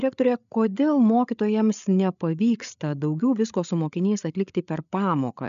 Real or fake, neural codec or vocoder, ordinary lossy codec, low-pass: fake; codec, 16 kHz, 8 kbps, FreqCodec, larger model; MP3, 96 kbps; 7.2 kHz